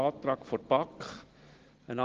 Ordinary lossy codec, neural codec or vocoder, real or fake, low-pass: Opus, 16 kbps; none; real; 7.2 kHz